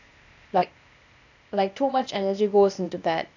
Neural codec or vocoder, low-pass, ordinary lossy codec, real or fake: codec, 16 kHz, 0.8 kbps, ZipCodec; 7.2 kHz; none; fake